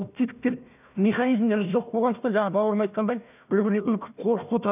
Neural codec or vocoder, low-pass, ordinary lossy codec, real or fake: codec, 16 kHz, 1 kbps, FunCodec, trained on Chinese and English, 50 frames a second; 3.6 kHz; none; fake